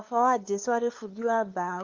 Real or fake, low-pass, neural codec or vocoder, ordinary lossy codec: fake; 7.2 kHz; codec, 16 kHz, 2 kbps, FunCodec, trained on LibriTTS, 25 frames a second; Opus, 24 kbps